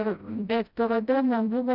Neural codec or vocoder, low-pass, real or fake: codec, 16 kHz, 0.5 kbps, FreqCodec, smaller model; 5.4 kHz; fake